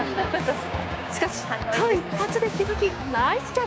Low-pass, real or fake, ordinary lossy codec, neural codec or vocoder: none; fake; none; codec, 16 kHz, 6 kbps, DAC